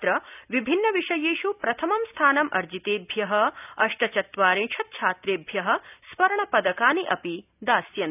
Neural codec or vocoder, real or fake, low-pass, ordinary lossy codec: none; real; 3.6 kHz; none